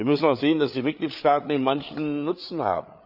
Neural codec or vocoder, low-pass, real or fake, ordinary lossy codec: codec, 16 kHz, 8 kbps, FreqCodec, larger model; 5.4 kHz; fake; none